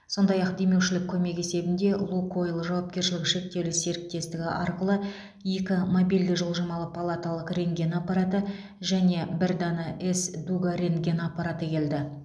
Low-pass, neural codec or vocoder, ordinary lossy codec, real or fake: none; none; none; real